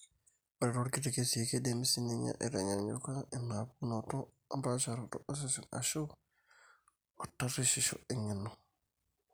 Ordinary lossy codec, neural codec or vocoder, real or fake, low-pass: none; none; real; none